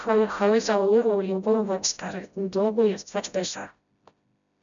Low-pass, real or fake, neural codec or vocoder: 7.2 kHz; fake; codec, 16 kHz, 0.5 kbps, FreqCodec, smaller model